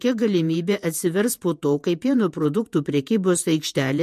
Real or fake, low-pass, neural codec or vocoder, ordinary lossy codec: fake; 14.4 kHz; vocoder, 48 kHz, 128 mel bands, Vocos; MP3, 64 kbps